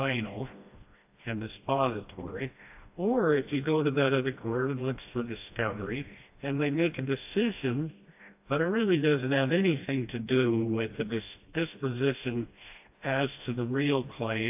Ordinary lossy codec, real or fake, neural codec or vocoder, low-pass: AAC, 32 kbps; fake; codec, 16 kHz, 1 kbps, FreqCodec, smaller model; 3.6 kHz